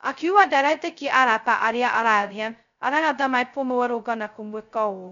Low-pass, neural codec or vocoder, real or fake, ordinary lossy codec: 7.2 kHz; codec, 16 kHz, 0.2 kbps, FocalCodec; fake; none